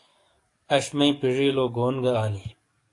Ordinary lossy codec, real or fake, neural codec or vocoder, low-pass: AAC, 48 kbps; fake; codec, 44.1 kHz, 7.8 kbps, DAC; 10.8 kHz